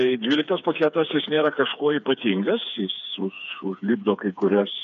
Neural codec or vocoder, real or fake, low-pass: codec, 16 kHz, 4 kbps, FreqCodec, smaller model; fake; 7.2 kHz